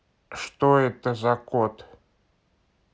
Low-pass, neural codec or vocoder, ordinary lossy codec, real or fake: none; none; none; real